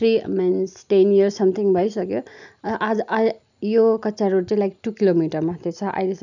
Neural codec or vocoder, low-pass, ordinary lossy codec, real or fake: none; 7.2 kHz; none; real